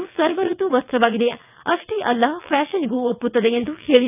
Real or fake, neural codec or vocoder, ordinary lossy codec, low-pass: fake; vocoder, 22.05 kHz, 80 mel bands, WaveNeXt; none; 3.6 kHz